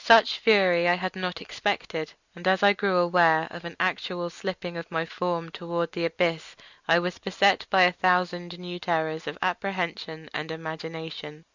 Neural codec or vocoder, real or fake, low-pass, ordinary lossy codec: none; real; 7.2 kHz; Opus, 64 kbps